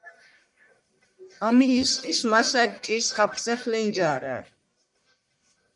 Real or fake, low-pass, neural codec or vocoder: fake; 10.8 kHz; codec, 44.1 kHz, 1.7 kbps, Pupu-Codec